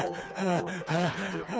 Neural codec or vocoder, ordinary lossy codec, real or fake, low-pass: codec, 16 kHz, 4 kbps, FreqCodec, smaller model; none; fake; none